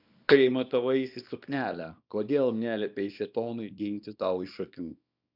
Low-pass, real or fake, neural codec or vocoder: 5.4 kHz; fake; codec, 24 kHz, 0.9 kbps, WavTokenizer, small release